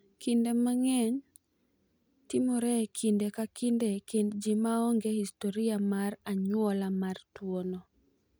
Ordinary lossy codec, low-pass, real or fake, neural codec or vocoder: none; none; real; none